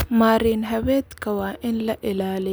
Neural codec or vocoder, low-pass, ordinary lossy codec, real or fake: none; none; none; real